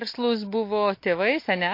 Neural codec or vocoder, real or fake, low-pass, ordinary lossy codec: none; real; 5.4 kHz; MP3, 32 kbps